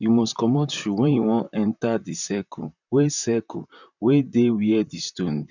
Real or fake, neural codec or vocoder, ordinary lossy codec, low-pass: fake; vocoder, 44.1 kHz, 128 mel bands, Pupu-Vocoder; none; 7.2 kHz